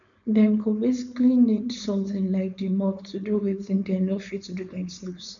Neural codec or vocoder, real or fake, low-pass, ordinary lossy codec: codec, 16 kHz, 4.8 kbps, FACodec; fake; 7.2 kHz; none